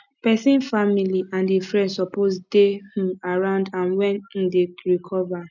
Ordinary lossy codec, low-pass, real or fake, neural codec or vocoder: none; 7.2 kHz; real; none